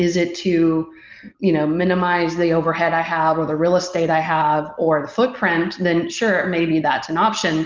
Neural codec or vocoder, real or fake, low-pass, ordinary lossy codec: none; real; 7.2 kHz; Opus, 32 kbps